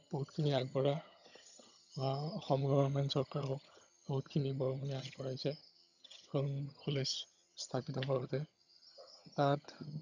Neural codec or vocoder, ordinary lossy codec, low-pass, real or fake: vocoder, 22.05 kHz, 80 mel bands, HiFi-GAN; none; 7.2 kHz; fake